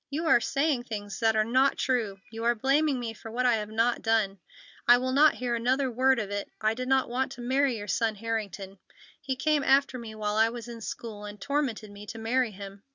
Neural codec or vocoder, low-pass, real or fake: none; 7.2 kHz; real